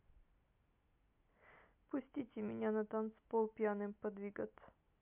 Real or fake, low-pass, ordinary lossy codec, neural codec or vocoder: real; 3.6 kHz; MP3, 32 kbps; none